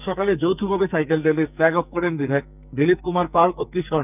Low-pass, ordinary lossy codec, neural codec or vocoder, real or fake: 3.6 kHz; none; codec, 44.1 kHz, 2.6 kbps, SNAC; fake